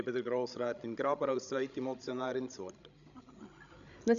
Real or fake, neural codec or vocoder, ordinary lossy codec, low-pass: fake; codec, 16 kHz, 16 kbps, FreqCodec, larger model; none; 7.2 kHz